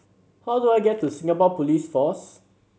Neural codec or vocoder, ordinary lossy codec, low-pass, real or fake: none; none; none; real